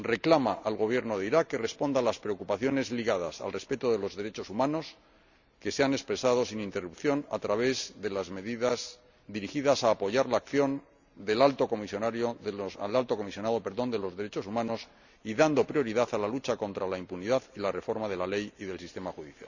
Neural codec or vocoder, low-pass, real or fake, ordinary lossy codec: none; 7.2 kHz; real; none